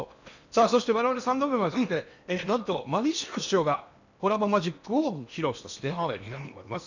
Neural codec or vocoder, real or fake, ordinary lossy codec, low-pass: codec, 16 kHz in and 24 kHz out, 0.8 kbps, FocalCodec, streaming, 65536 codes; fake; none; 7.2 kHz